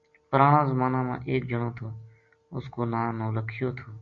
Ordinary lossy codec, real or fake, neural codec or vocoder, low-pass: Opus, 64 kbps; real; none; 7.2 kHz